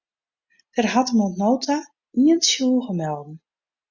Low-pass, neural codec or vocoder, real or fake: 7.2 kHz; none; real